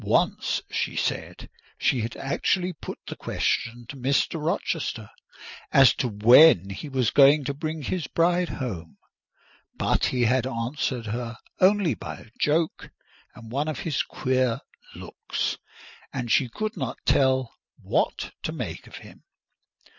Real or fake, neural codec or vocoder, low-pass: real; none; 7.2 kHz